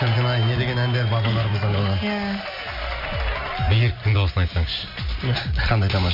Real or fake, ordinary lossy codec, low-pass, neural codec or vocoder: real; MP3, 48 kbps; 5.4 kHz; none